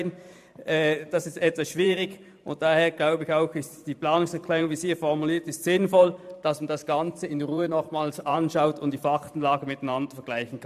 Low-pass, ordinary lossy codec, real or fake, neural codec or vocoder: 14.4 kHz; AAC, 96 kbps; fake; vocoder, 44.1 kHz, 128 mel bands every 512 samples, BigVGAN v2